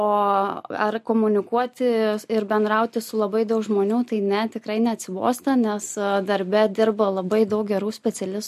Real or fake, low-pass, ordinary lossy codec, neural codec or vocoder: real; 14.4 kHz; MP3, 64 kbps; none